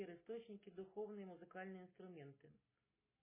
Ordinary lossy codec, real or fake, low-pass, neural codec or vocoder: MP3, 16 kbps; real; 3.6 kHz; none